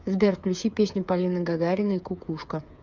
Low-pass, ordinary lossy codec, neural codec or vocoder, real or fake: 7.2 kHz; MP3, 64 kbps; codec, 16 kHz, 8 kbps, FreqCodec, smaller model; fake